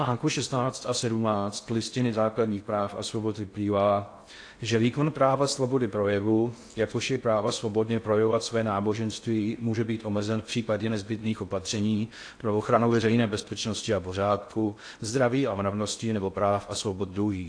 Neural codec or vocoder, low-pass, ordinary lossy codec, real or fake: codec, 16 kHz in and 24 kHz out, 0.6 kbps, FocalCodec, streaming, 2048 codes; 9.9 kHz; AAC, 48 kbps; fake